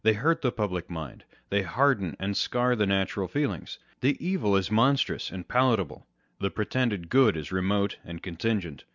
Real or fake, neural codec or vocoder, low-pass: real; none; 7.2 kHz